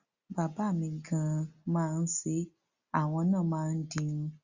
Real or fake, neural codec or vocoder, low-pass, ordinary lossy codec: real; none; 7.2 kHz; Opus, 64 kbps